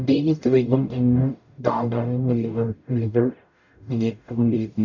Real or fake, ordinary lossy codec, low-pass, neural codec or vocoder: fake; none; 7.2 kHz; codec, 44.1 kHz, 0.9 kbps, DAC